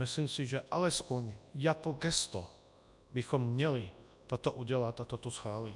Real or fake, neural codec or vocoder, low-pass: fake; codec, 24 kHz, 0.9 kbps, WavTokenizer, large speech release; 10.8 kHz